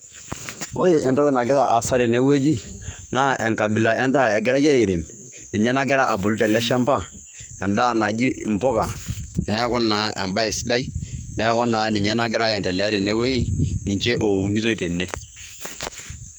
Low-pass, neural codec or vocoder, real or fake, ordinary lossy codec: none; codec, 44.1 kHz, 2.6 kbps, SNAC; fake; none